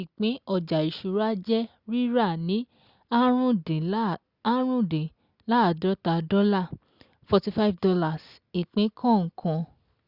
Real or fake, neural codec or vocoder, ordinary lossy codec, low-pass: real; none; Opus, 64 kbps; 5.4 kHz